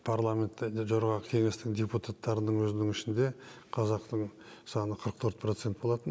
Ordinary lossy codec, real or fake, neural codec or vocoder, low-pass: none; real; none; none